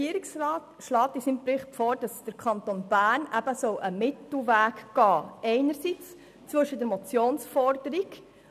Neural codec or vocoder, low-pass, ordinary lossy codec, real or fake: none; 14.4 kHz; none; real